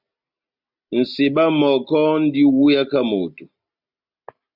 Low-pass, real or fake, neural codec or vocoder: 5.4 kHz; real; none